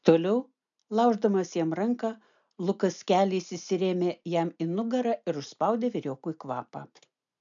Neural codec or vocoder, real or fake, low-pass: none; real; 7.2 kHz